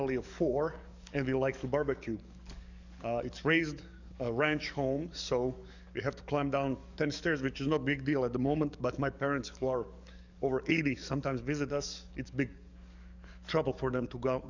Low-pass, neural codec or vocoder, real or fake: 7.2 kHz; codec, 44.1 kHz, 7.8 kbps, DAC; fake